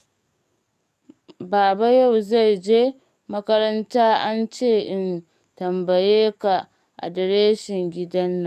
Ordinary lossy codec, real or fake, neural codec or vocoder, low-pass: none; fake; codec, 44.1 kHz, 7.8 kbps, DAC; 14.4 kHz